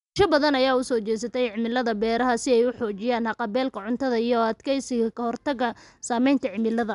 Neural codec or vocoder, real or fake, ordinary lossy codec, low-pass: none; real; MP3, 96 kbps; 10.8 kHz